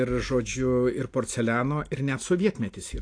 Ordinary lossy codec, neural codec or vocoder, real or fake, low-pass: AAC, 48 kbps; none; real; 9.9 kHz